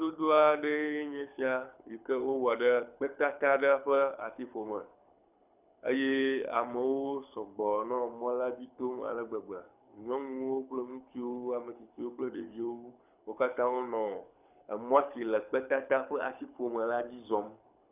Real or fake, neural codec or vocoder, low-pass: fake; codec, 24 kHz, 6 kbps, HILCodec; 3.6 kHz